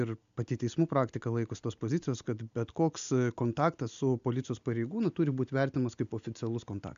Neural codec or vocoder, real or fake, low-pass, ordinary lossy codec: none; real; 7.2 kHz; MP3, 64 kbps